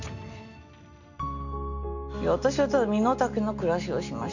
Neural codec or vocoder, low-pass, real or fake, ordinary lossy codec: none; 7.2 kHz; real; none